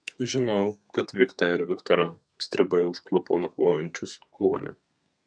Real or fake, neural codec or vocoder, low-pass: fake; codec, 32 kHz, 1.9 kbps, SNAC; 9.9 kHz